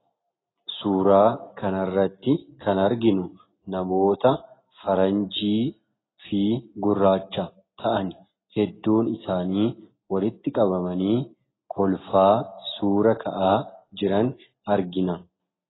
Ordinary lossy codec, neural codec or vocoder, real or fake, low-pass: AAC, 16 kbps; none; real; 7.2 kHz